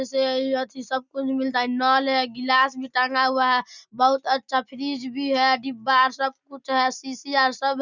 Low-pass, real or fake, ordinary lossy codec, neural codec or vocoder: 7.2 kHz; real; none; none